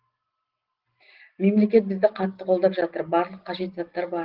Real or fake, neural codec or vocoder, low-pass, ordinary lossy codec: real; none; 5.4 kHz; Opus, 16 kbps